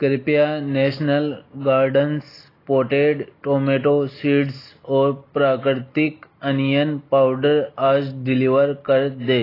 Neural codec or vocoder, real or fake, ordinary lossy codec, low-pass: none; real; AAC, 24 kbps; 5.4 kHz